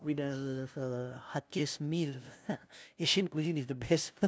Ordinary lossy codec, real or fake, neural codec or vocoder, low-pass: none; fake; codec, 16 kHz, 0.5 kbps, FunCodec, trained on LibriTTS, 25 frames a second; none